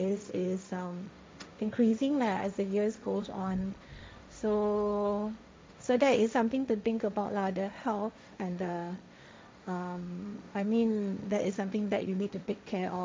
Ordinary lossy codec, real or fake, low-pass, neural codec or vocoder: none; fake; none; codec, 16 kHz, 1.1 kbps, Voila-Tokenizer